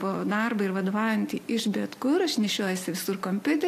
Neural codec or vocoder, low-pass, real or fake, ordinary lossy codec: none; 14.4 kHz; real; AAC, 64 kbps